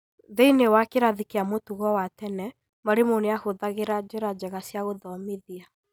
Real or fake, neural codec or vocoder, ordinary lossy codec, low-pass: real; none; none; none